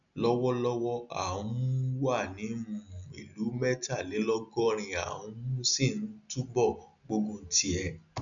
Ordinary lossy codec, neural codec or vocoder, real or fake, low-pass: none; none; real; 7.2 kHz